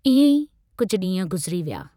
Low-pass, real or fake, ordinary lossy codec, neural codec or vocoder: 19.8 kHz; fake; none; vocoder, 44.1 kHz, 128 mel bands every 512 samples, BigVGAN v2